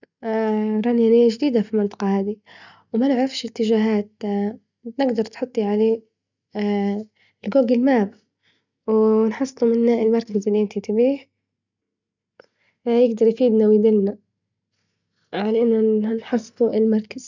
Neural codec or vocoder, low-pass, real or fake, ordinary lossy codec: none; 7.2 kHz; real; none